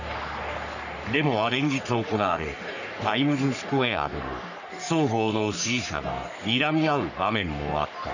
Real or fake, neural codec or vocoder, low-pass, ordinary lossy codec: fake; codec, 44.1 kHz, 3.4 kbps, Pupu-Codec; 7.2 kHz; none